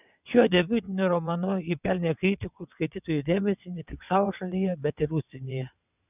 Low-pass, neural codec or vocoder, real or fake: 3.6 kHz; vocoder, 22.05 kHz, 80 mel bands, WaveNeXt; fake